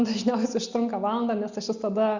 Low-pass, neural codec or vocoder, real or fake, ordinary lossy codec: 7.2 kHz; none; real; Opus, 64 kbps